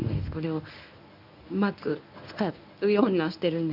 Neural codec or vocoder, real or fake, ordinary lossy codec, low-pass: codec, 24 kHz, 0.9 kbps, WavTokenizer, medium speech release version 1; fake; none; 5.4 kHz